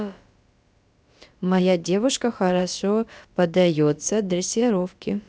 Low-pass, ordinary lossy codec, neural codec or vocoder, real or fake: none; none; codec, 16 kHz, about 1 kbps, DyCAST, with the encoder's durations; fake